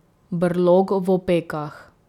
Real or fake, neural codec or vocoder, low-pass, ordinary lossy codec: real; none; 19.8 kHz; none